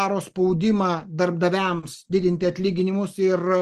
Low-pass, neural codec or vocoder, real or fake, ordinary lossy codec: 14.4 kHz; none; real; Opus, 24 kbps